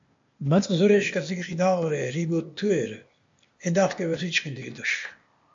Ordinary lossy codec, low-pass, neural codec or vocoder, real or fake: MP3, 48 kbps; 7.2 kHz; codec, 16 kHz, 0.8 kbps, ZipCodec; fake